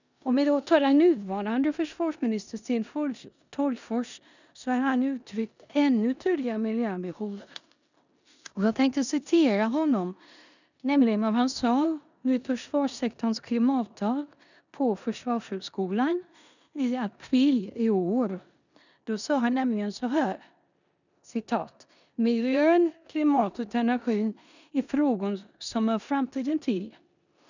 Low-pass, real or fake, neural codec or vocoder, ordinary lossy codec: 7.2 kHz; fake; codec, 16 kHz in and 24 kHz out, 0.9 kbps, LongCat-Audio-Codec, four codebook decoder; none